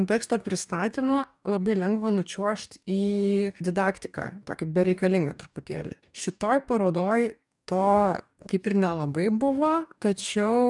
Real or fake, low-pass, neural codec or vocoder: fake; 10.8 kHz; codec, 44.1 kHz, 2.6 kbps, DAC